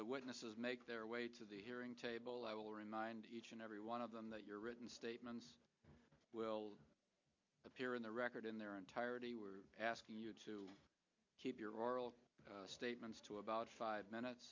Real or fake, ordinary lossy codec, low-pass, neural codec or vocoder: real; MP3, 48 kbps; 7.2 kHz; none